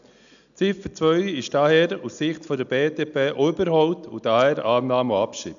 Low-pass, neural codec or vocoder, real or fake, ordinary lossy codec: 7.2 kHz; none; real; none